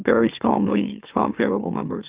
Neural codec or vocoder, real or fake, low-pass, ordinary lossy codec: autoencoder, 44.1 kHz, a latent of 192 numbers a frame, MeloTTS; fake; 3.6 kHz; Opus, 24 kbps